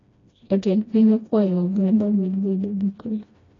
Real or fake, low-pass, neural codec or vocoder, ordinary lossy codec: fake; 7.2 kHz; codec, 16 kHz, 1 kbps, FreqCodec, smaller model; AAC, 32 kbps